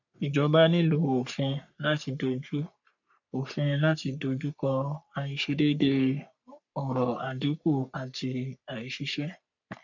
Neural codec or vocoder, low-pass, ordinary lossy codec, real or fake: codec, 44.1 kHz, 3.4 kbps, Pupu-Codec; 7.2 kHz; none; fake